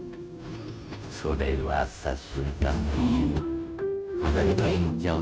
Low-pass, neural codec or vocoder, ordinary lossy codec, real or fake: none; codec, 16 kHz, 0.5 kbps, FunCodec, trained on Chinese and English, 25 frames a second; none; fake